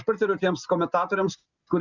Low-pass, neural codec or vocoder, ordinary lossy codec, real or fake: 7.2 kHz; none; Opus, 64 kbps; real